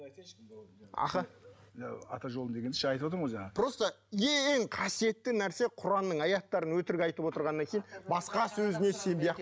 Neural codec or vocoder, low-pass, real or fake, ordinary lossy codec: none; none; real; none